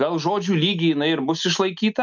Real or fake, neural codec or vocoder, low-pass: real; none; 7.2 kHz